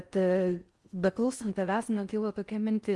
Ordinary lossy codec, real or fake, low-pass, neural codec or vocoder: Opus, 32 kbps; fake; 10.8 kHz; codec, 16 kHz in and 24 kHz out, 0.6 kbps, FocalCodec, streaming, 4096 codes